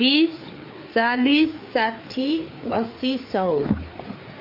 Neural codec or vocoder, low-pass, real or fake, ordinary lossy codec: codec, 16 kHz, 4 kbps, FreqCodec, larger model; 5.4 kHz; fake; MP3, 32 kbps